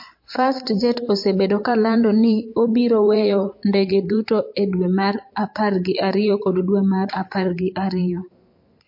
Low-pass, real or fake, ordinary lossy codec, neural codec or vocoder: 5.4 kHz; fake; MP3, 32 kbps; vocoder, 44.1 kHz, 128 mel bands every 512 samples, BigVGAN v2